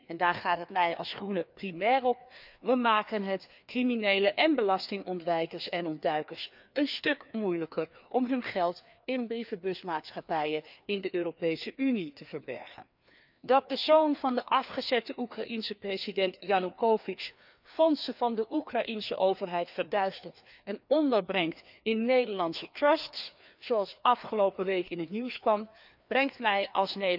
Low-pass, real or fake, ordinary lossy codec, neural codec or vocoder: 5.4 kHz; fake; none; codec, 16 kHz, 2 kbps, FreqCodec, larger model